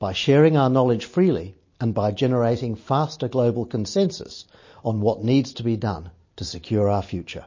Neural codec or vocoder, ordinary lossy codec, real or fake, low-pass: none; MP3, 32 kbps; real; 7.2 kHz